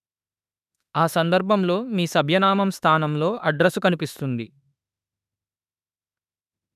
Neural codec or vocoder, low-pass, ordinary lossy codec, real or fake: autoencoder, 48 kHz, 32 numbers a frame, DAC-VAE, trained on Japanese speech; 14.4 kHz; none; fake